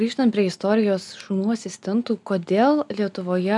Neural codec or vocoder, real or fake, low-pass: none; real; 10.8 kHz